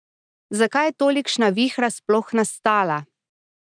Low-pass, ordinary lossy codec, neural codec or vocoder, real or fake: 9.9 kHz; none; none; real